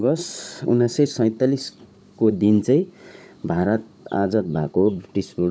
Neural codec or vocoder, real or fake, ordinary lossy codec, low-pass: codec, 16 kHz, 16 kbps, FunCodec, trained on Chinese and English, 50 frames a second; fake; none; none